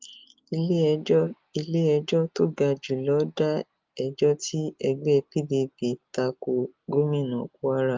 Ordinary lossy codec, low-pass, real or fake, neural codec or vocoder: Opus, 32 kbps; 7.2 kHz; real; none